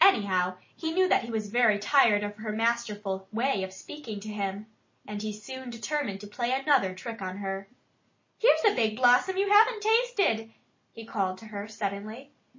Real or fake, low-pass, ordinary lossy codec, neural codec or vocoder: real; 7.2 kHz; MP3, 32 kbps; none